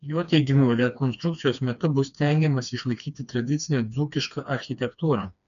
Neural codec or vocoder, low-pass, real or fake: codec, 16 kHz, 2 kbps, FreqCodec, smaller model; 7.2 kHz; fake